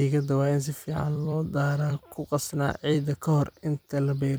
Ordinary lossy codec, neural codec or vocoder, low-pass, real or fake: none; vocoder, 44.1 kHz, 128 mel bands every 256 samples, BigVGAN v2; none; fake